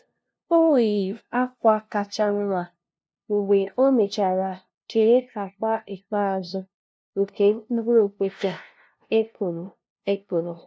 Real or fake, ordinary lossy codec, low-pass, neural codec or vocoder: fake; none; none; codec, 16 kHz, 0.5 kbps, FunCodec, trained on LibriTTS, 25 frames a second